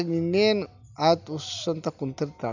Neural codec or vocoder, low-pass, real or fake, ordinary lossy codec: none; 7.2 kHz; real; none